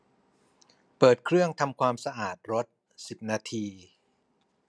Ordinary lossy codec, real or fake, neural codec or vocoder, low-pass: none; real; none; none